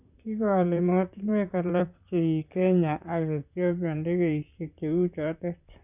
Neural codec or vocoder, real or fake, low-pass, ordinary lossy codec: vocoder, 44.1 kHz, 128 mel bands, Pupu-Vocoder; fake; 3.6 kHz; none